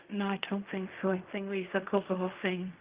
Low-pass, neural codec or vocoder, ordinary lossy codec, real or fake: 3.6 kHz; codec, 16 kHz in and 24 kHz out, 0.4 kbps, LongCat-Audio-Codec, fine tuned four codebook decoder; Opus, 32 kbps; fake